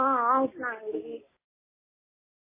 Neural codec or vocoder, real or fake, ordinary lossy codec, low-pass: none; real; MP3, 16 kbps; 3.6 kHz